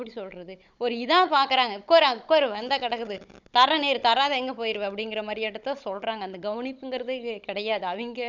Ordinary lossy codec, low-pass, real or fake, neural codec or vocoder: none; 7.2 kHz; fake; codec, 16 kHz, 16 kbps, FunCodec, trained on Chinese and English, 50 frames a second